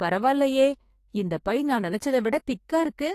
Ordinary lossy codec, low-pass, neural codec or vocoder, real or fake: AAC, 64 kbps; 14.4 kHz; codec, 44.1 kHz, 2.6 kbps, SNAC; fake